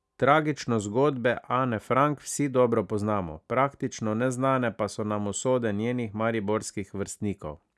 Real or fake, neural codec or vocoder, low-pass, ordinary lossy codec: real; none; none; none